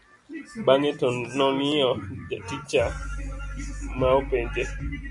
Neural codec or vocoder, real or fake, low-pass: none; real; 10.8 kHz